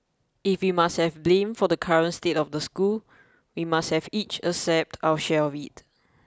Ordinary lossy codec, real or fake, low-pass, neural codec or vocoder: none; real; none; none